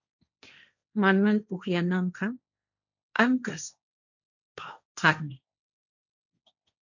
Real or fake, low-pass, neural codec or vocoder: fake; 7.2 kHz; codec, 16 kHz, 1.1 kbps, Voila-Tokenizer